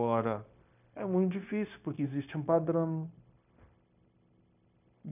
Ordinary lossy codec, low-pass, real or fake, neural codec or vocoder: none; 3.6 kHz; fake; codec, 16 kHz, 0.9 kbps, LongCat-Audio-Codec